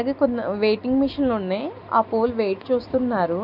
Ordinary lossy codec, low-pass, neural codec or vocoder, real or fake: none; 5.4 kHz; none; real